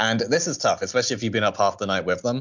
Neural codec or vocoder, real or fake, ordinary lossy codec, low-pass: none; real; MP3, 64 kbps; 7.2 kHz